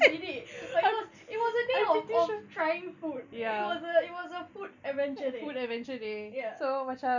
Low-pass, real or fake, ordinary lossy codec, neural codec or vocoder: 7.2 kHz; real; none; none